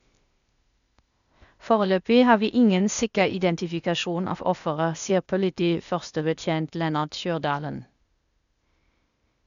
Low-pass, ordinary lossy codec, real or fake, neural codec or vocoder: 7.2 kHz; none; fake; codec, 16 kHz, 0.8 kbps, ZipCodec